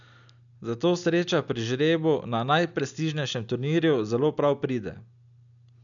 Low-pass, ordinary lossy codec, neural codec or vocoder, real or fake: 7.2 kHz; none; none; real